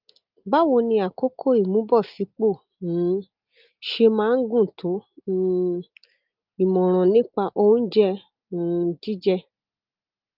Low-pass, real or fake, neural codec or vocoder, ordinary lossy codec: 5.4 kHz; real; none; Opus, 32 kbps